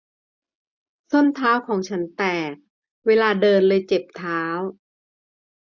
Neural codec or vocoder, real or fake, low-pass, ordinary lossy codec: none; real; 7.2 kHz; none